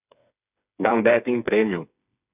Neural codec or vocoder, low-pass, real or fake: codec, 16 kHz, 4 kbps, FreqCodec, smaller model; 3.6 kHz; fake